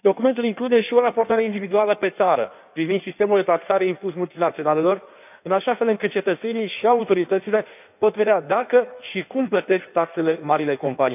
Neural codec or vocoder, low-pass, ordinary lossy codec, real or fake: codec, 16 kHz in and 24 kHz out, 1.1 kbps, FireRedTTS-2 codec; 3.6 kHz; none; fake